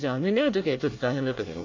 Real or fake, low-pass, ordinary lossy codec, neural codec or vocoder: fake; 7.2 kHz; MP3, 48 kbps; codec, 24 kHz, 1 kbps, SNAC